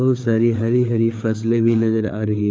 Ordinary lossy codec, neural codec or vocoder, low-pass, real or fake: none; codec, 16 kHz, 4 kbps, FreqCodec, larger model; none; fake